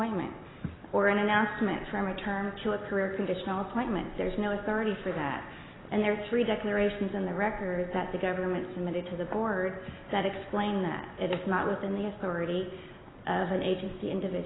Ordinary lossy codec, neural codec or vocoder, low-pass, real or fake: AAC, 16 kbps; none; 7.2 kHz; real